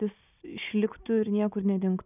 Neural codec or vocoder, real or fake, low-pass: none; real; 3.6 kHz